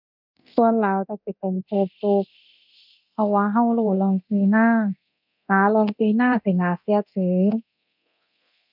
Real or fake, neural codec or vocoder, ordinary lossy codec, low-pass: fake; codec, 24 kHz, 0.9 kbps, DualCodec; none; 5.4 kHz